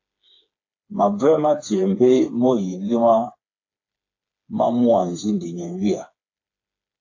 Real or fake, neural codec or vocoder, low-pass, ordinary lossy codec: fake; codec, 16 kHz, 4 kbps, FreqCodec, smaller model; 7.2 kHz; AAC, 48 kbps